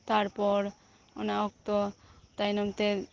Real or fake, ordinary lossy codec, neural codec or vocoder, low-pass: real; Opus, 16 kbps; none; 7.2 kHz